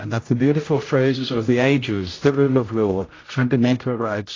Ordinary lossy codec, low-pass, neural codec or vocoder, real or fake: AAC, 32 kbps; 7.2 kHz; codec, 16 kHz, 0.5 kbps, X-Codec, HuBERT features, trained on general audio; fake